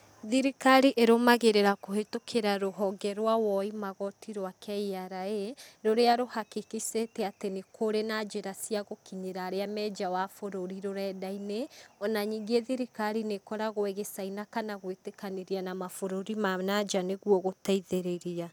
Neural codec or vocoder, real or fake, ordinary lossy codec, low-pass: none; real; none; none